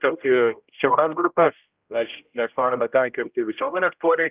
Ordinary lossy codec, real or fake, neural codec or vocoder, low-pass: Opus, 32 kbps; fake; codec, 16 kHz, 0.5 kbps, X-Codec, HuBERT features, trained on general audio; 3.6 kHz